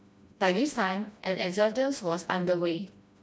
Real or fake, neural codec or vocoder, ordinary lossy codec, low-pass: fake; codec, 16 kHz, 1 kbps, FreqCodec, smaller model; none; none